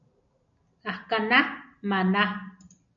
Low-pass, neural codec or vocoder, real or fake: 7.2 kHz; none; real